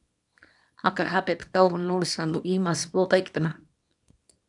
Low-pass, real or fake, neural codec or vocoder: 10.8 kHz; fake; codec, 24 kHz, 0.9 kbps, WavTokenizer, small release